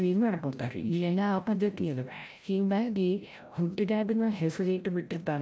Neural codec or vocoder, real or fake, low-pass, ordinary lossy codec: codec, 16 kHz, 0.5 kbps, FreqCodec, larger model; fake; none; none